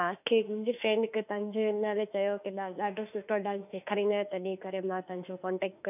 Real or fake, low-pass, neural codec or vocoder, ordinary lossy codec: fake; 3.6 kHz; autoencoder, 48 kHz, 32 numbers a frame, DAC-VAE, trained on Japanese speech; none